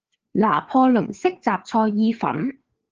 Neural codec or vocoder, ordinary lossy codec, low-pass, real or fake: codec, 16 kHz, 4 kbps, FreqCodec, larger model; Opus, 16 kbps; 7.2 kHz; fake